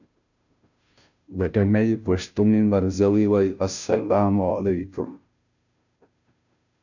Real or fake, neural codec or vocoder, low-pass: fake; codec, 16 kHz, 0.5 kbps, FunCodec, trained on Chinese and English, 25 frames a second; 7.2 kHz